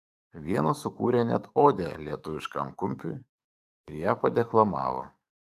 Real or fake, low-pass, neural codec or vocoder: fake; 14.4 kHz; codec, 44.1 kHz, 7.8 kbps, DAC